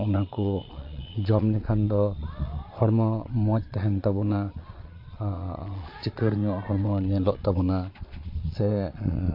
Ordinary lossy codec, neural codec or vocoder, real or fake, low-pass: MP3, 32 kbps; vocoder, 22.05 kHz, 80 mel bands, Vocos; fake; 5.4 kHz